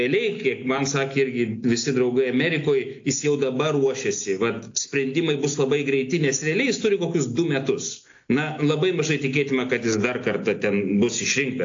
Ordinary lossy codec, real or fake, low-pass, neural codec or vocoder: AAC, 48 kbps; real; 7.2 kHz; none